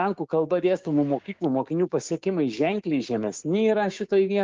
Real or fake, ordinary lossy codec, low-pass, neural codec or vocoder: fake; Opus, 24 kbps; 7.2 kHz; codec, 16 kHz, 6 kbps, DAC